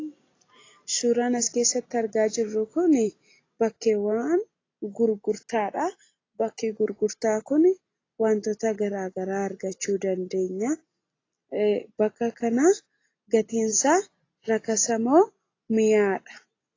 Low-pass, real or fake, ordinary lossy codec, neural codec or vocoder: 7.2 kHz; real; AAC, 32 kbps; none